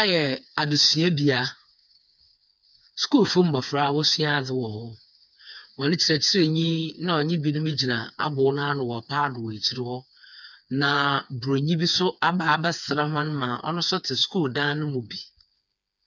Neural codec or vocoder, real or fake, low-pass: codec, 16 kHz, 4 kbps, FreqCodec, smaller model; fake; 7.2 kHz